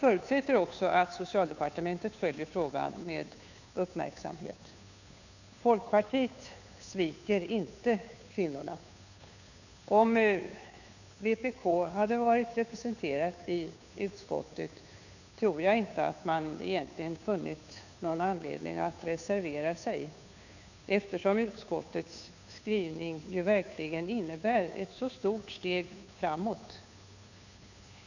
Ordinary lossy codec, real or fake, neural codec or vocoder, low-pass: none; fake; codec, 16 kHz, 2 kbps, FunCodec, trained on Chinese and English, 25 frames a second; 7.2 kHz